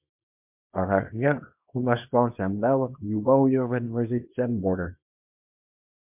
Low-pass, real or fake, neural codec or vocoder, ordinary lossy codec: 3.6 kHz; fake; codec, 24 kHz, 0.9 kbps, WavTokenizer, small release; MP3, 32 kbps